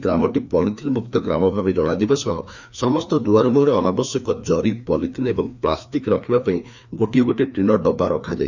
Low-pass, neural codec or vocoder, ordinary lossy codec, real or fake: 7.2 kHz; codec, 16 kHz, 2 kbps, FreqCodec, larger model; none; fake